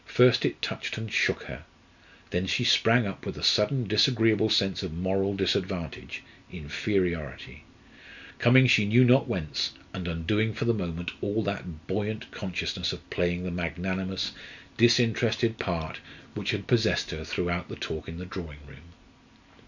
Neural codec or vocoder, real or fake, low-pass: none; real; 7.2 kHz